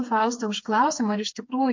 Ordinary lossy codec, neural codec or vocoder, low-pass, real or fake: MP3, 48 kbps; codec, 16 kHz, 4 kbps, FreqCodec, smaller model; 7.2 kHz; fake